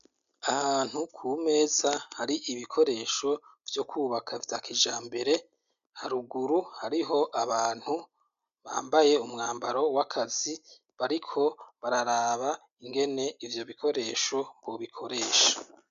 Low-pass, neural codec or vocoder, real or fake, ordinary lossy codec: 7.2 kHz; none; real; MP3, 96 kbps